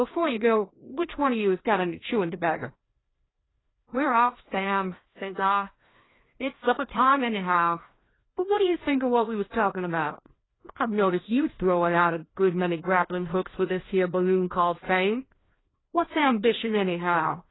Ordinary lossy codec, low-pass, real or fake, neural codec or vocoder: AAC, 16 kbps; 7.2 kHz; fake; codec, 16 kHz, 1 kbps, FreqCodec, larger model